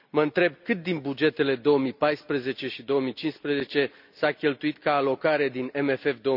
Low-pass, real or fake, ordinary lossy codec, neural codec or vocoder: 5.4 kHz; real; none; none